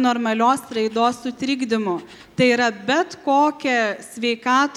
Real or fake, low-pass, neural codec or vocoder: real; 19.8 kHz; none